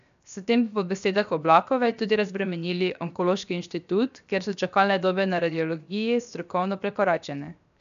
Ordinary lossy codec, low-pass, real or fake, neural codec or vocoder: none; 7.2 kHz; fake; codec, 16 kHz, 0.7 kbps, FocalCodec